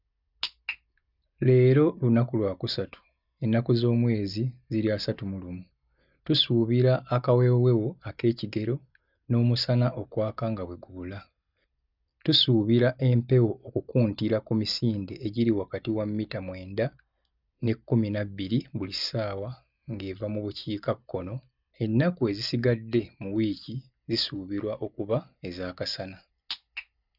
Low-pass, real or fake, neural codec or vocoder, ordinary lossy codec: 5.4 kHz; real; none; none